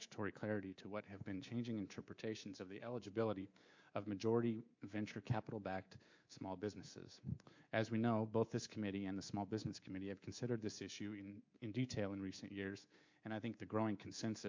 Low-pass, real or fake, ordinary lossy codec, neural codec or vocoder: 7.2 kHz; fake; MP3, 48 kbps; codec, 24 kHz, 3.1 kbps, DualCodec